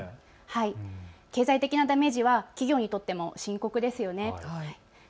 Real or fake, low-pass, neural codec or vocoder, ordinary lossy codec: real; none; none; none